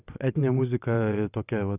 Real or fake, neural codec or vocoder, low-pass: fake; vocoder, 22.05 kHz, 80 mel bands, WaveNeXt; 3.6 kHz